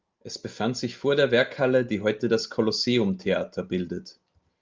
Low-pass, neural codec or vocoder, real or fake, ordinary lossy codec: 7.2 kHz; none; real; Opus, 24 kbps